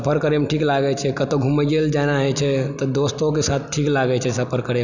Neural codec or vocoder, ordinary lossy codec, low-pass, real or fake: none; none; 7.2 kHz; real